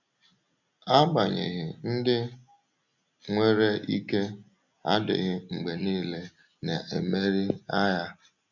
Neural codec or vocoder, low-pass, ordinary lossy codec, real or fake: none; 7.2 kHz; none; real